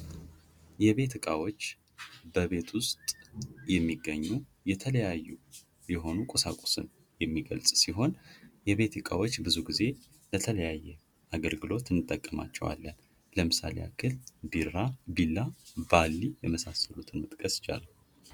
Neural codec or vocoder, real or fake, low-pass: none; real; 19.8 kHz